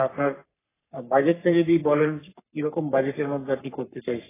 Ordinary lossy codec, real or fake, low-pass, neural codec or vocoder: AAC, 16 kbps; fake; 3.6 kHz; codec, 44.1 kHz, 3.4 kbps, Pupu-Codec